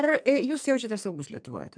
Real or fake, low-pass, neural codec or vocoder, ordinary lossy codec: fake; 9.9 kHz; codec, 44.1 kHz, 2.6 kbps, SNAC; MP3, 96 kbps